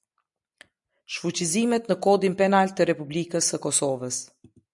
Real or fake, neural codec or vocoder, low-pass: real; none; 10.8 kHz